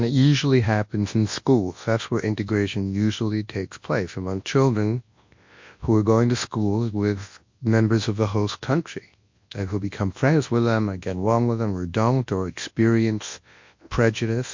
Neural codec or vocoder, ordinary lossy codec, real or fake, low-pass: codec, 24 kHz, 0.9 kbps, WavTokenizer, large speech release; MP3, 48 kbps; fake; 7.2 kHz